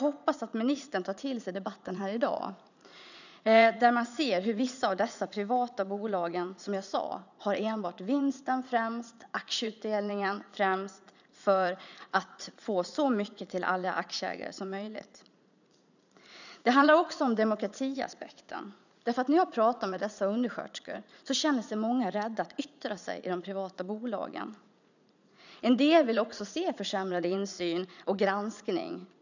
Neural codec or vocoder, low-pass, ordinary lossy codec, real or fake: vocoder, 44.1 kHz, 80 mel bands, Vocos; 7.2 kHz; none; fake